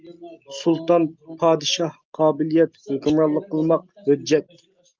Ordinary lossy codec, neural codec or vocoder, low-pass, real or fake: Opus, 24 kbps; none; 7.2 kHz; real